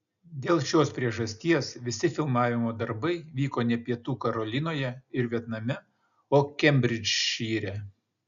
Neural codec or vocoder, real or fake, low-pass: none; real; 7.2 kHz